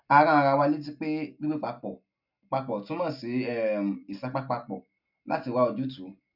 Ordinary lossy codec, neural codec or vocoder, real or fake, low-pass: none; none; real; 5.4 kHz